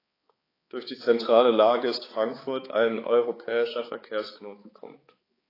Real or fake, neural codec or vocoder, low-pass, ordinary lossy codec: fake; codec, 16 kHz, 4 kbps, X-Codec, HuBERT features, trained on balanced general audio; 5.4 kHz; AAC, 24 kbps